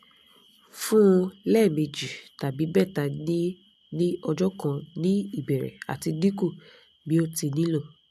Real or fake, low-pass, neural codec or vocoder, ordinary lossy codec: real; 14.4 kHz; none; none